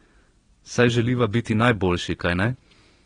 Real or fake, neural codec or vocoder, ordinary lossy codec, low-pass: fake; vocoder, 22.05 kHz, 80 mel bands, Vocos; AAC, 32 kbps; 9.9 kHz